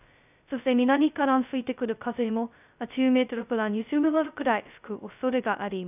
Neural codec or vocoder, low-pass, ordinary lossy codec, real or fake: codec, 16 kHz, 0.2 kbps, FocalCodec; 3.6 kHz; none; fake